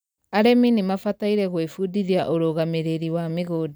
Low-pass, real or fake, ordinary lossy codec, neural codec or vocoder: none; real; none; none